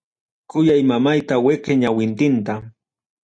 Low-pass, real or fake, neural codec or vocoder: 9.9 kHz; real; none